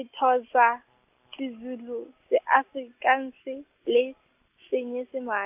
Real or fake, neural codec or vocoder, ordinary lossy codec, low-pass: real; none; AAC, 32 kbps; 3.6 kHz